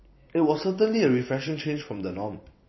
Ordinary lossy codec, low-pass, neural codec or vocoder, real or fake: MP3, 24 kbps; 7.2 kHz; vocoder, 44.1 kHz, 128 mel bands every 256 samples, BigVGAN v2; fake